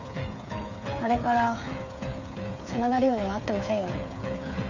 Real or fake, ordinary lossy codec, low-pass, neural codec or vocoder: fake; AAC, 48 kbps; 7.2 kHz; codec, 16 kHz, 8 kbps, FreqCodec, smaller model